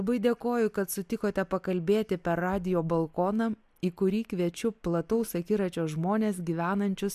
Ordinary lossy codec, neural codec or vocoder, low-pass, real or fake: MP3, 96 kbps; none; 14.4 kHz; real